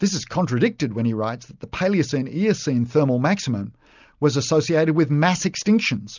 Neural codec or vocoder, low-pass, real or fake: none; 7.2 kHz; real